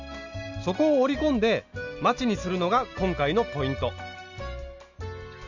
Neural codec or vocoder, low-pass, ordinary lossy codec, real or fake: none; 7.2 kHz; none; real